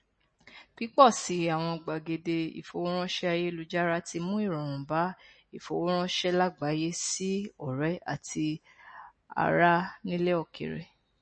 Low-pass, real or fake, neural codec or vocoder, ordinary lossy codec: 9.9 kHz; real; none; MP3, 32 kbps